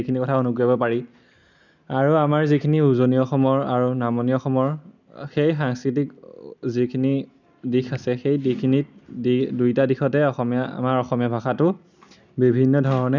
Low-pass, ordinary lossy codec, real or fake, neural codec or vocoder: 7.2 kHz; none; real; none